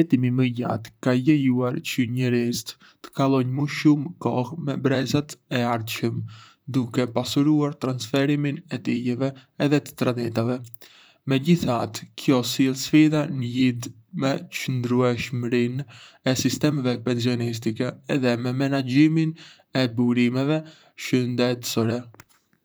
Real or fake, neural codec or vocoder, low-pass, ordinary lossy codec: fake; vocoder, 44.1 kHz, 128 mel bands, Pupu-Vocoder; none; none